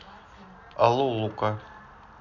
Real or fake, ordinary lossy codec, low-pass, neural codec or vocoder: real; none; 7.2 kHz; none